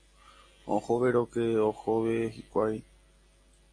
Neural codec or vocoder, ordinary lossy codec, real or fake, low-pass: none; AAC, 32 kbps; real; 9.9 kHz